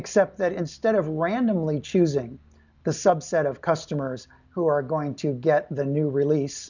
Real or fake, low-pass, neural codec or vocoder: real; 7.2 kHz; none